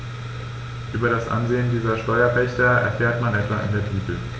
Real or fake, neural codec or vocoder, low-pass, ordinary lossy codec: real; none; none; none